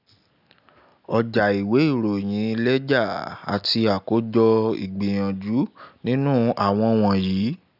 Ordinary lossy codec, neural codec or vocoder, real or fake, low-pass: none; none; real; 5.4 kHz